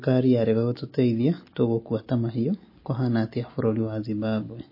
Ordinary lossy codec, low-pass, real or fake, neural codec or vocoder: MP3, 24 kbps; 5.4 kHz; real; none